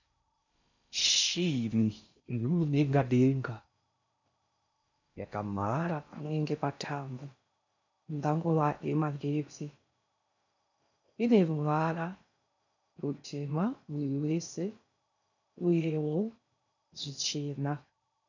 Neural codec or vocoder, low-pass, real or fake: codec, 16 kHz in and 24 kHz out, 0.6 kbps, FocalCodec, streaming, 4096 codes; 7.2 kHz; fake